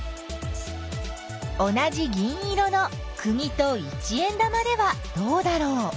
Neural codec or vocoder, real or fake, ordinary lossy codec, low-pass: none; real; none; none